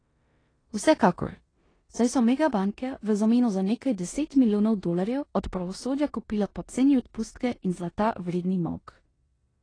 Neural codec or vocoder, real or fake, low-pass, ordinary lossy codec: codec, 16 kHz in and 24 kHz out, 0.9 kbps, LongCat-Audio-Codec, four codebook decoder; fake; 9.9 kHz; AAC, 32 kbps